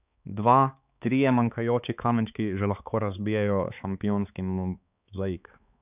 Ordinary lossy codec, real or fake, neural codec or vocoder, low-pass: none; fake; codec, 16 kHz, 4 kbps, X-Codec, HuBERT features, trained on balanced general audio; 3.6 kHz